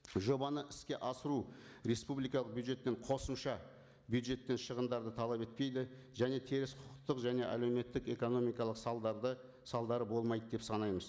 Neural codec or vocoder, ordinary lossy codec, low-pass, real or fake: none; none; none; real